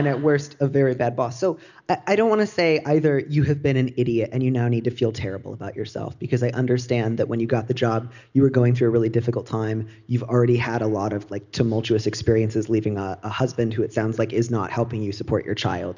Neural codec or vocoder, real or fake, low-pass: none; real; 7.2 kHz